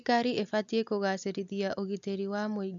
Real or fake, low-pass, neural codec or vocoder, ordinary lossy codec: real; 7.2 kHz; none; none